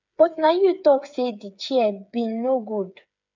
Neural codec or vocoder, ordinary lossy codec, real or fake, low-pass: codec, 16 kHz, 8 kbps, FreqCodec, smaller model; none; fake; 7.2 kHz